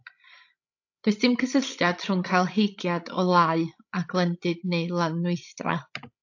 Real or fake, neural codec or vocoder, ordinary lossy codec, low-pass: fake; codec, 16 kHz, 16 kbps, FreqCodec, larger model; AAC, 48 kbps; 7.2 kHz